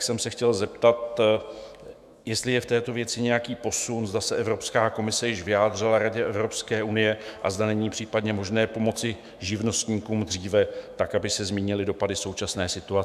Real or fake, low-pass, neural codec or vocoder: fake; 14.4 kHz; autoencoder, 48 kHz, 128 numbers a frame, DAC-VAE, trained on Japanese speech